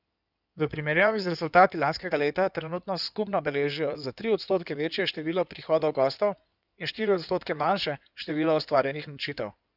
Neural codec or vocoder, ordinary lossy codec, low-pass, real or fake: codec, 16 kHz in and 24 kHz out, 2.2 kbps, FireRedTTS-2 codec; none; 5.4 kHz; fake